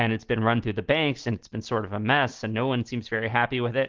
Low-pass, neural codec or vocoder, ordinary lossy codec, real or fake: 7.2 kHz; none; Opus, 16 kbps; real